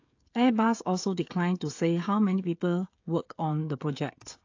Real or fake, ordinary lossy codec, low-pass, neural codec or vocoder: fake; AAC, 48 kbps; 7.2 kHz; codec, 16 kHz, 16 kbps, FreqCodec, smaller model